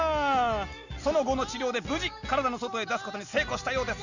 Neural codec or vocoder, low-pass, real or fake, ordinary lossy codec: none; 7.2 kHz; real; none